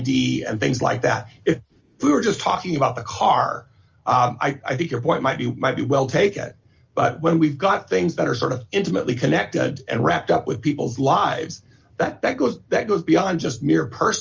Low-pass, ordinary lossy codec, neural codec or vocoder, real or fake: 7.2 kHz; Opus, 32 kbps; none; real